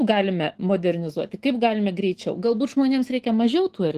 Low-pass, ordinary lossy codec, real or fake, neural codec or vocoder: 14.4 kHz; Opus, 16 kbps; fake; autoencoder, 48 kHz, 128 numbers a frame, DAC-VAE, trained on Japanese speech